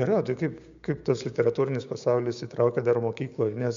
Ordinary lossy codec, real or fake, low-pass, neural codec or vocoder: MP3, 64 kbps; fake; 7.2 kHz; codec, 16 kHz, 6 kbps, DAC